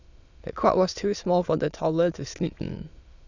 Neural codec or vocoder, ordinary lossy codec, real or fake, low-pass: autoencoder, 22.05 kHz, a latent of 192 numbers a frame, VITS, trained on many speakers; none; fake; 7.2 kHz